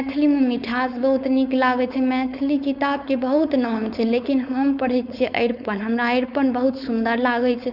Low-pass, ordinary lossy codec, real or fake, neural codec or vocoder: 5.4 kHz; none; fake; codec, 16 kHz, 4.8 kbps, FACodec